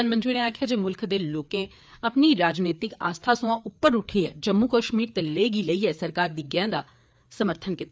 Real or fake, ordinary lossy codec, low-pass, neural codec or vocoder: fake; none; none; codec, 16 kHz, 4 kbps, FreqCodec, larger model